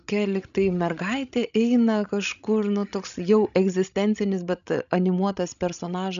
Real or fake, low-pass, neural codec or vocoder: fake; 7.2 kHz; codec, 16 kHz, 16 kbps, FreqCodec, larger model